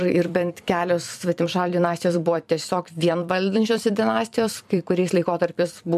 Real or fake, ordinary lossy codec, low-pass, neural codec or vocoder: fake; MP3, 96 kbps; 14.4 kHz; vocoder, 44.1 kHz, 128 mel bands every 256 samples, BigVGAN v2